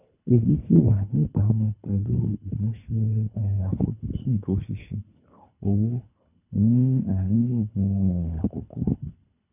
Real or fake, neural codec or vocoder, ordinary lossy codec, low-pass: fake; codec, 24 kHz, 3 kbps, HILCodec; none; 3.6 kHz